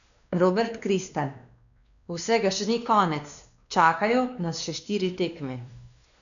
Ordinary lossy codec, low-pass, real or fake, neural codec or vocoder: none; 7.2 kHz; fake; codec, 16 kHz, 2 kbps, X-Codec, WavLM features, trained on Multilingual LibriSpeech